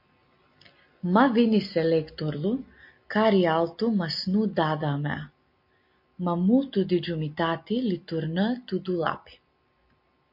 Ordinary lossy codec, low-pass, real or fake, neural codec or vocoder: MP3, 32 kbps; 5.4 kHz; real; none